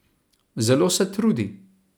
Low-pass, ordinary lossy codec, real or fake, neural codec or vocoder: none; none; real; none